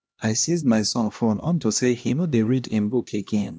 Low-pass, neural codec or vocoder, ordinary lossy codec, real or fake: none; codec, 16 kHz, 1 kbps, X-Codec, HuBERT features, trained on LibriSpeech; none; fake